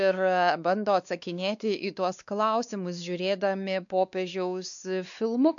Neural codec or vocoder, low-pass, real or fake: codec, 16 kHz, 2 kbps, X-Codec, WavLM features, trained on Multilingual LibriSpeech; 7.2 kHz; fake